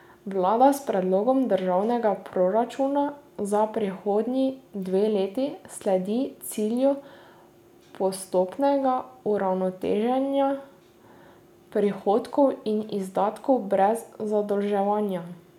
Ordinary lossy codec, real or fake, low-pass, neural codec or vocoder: none; real; 19.8 kHz; none